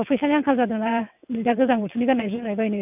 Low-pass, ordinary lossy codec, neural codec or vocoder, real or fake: 3.6 kHz; none; vocoder, 22.05 kHz, 80 mel bands, WaveNeXt; fake